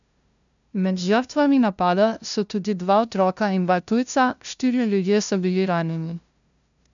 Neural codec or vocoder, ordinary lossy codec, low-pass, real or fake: codec, 16 kHz, 0.5 kbps, FunCodec, trained on LibriTTS, 25 frames a second; none; 7.2 kHz; fake